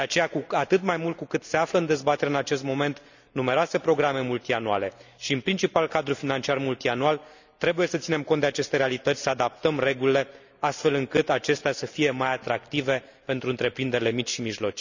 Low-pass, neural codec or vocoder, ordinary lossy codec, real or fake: 7.2 kHz; none; none; real